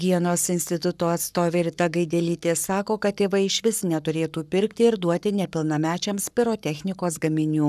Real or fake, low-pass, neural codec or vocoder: fake; 14.4 kHz; codec, 44.1 kHz, 7.8 kbps, Pupu-Codec